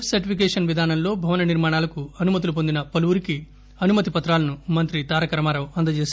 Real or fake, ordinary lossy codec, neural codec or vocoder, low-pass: real; none; none; none